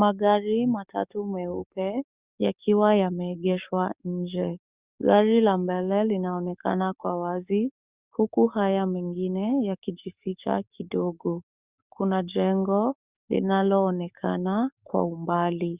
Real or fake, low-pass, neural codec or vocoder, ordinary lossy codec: fake; 3.6 kHz; codec, 44.1 kHz, 7.8 kbps, DAC; Opus, 64 kbps